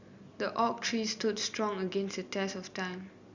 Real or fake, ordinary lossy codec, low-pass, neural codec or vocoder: real; Opus, 64 kbps; 7.2 kHz; none